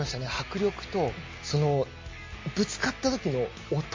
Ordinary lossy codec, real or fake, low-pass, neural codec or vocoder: MP3, 32 kbps; real; 7.2 kHz; none